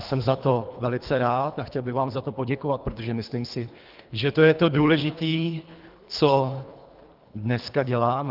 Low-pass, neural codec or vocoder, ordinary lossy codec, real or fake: 5.4 kHz; codec, 24 kHz, 3 kbps, HILCodec; Opus, 24 kbps; fake